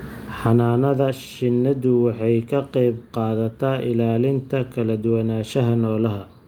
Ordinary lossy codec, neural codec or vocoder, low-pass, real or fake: Opus, 32 kbps; none; 19.8 kHz; real